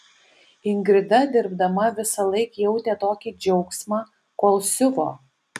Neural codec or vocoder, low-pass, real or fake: none; 14.4 kHz; real